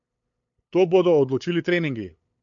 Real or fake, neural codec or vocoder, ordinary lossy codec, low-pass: fake; codec, 16 kHz, 8 kbps, FunCodec, trained on LibriTTS, 25 frames a second; MP3, 48 kbps; 7.2 kHz